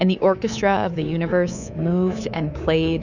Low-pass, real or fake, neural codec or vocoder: 7.2 kHz; fake; codec, 24 kHz, 3.1 kbps, DualCodec